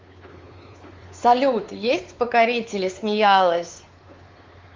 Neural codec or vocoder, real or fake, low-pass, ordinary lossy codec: codec, 16 kHz, 4 kbps, X-Codec, WavLM features, trained on Multilingual LibriSpeech; fake; 7.2 kHz; Opus, 32 kbps